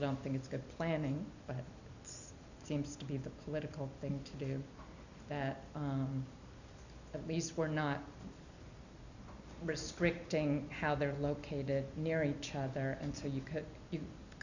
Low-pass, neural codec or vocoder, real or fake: 7.2 kHz; none; real